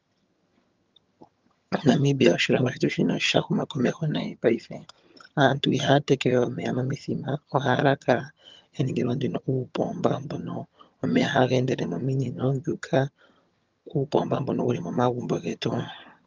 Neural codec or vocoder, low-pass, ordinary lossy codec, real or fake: vocoder, 22.05 kHz, 80 mel bands, HiFi-GAN; 7.2 kHz; Opus, 24 kbps; fake